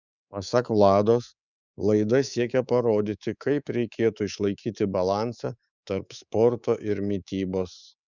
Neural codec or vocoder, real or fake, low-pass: codec, 24 kHz, 3.1 kbps, DualCodec; fake; 7.2 kHz